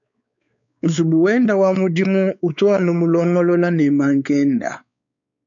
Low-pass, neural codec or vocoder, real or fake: 7.2 kHz; codec, 16 kHz, 4 kbps, X-Codec, WavLM features, trained on Multilingual LibriSpeech; fake